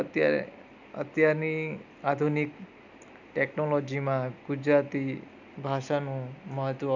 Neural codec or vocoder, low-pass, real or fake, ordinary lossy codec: none; 7.2 kHz; real; none